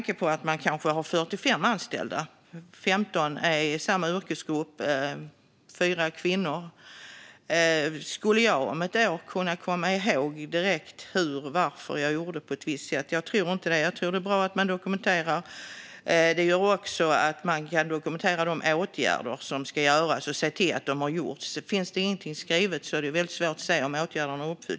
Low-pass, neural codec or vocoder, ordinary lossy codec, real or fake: none; none; none; real